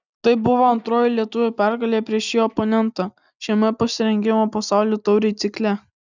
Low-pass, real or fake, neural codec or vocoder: 7.2 kHz; real; none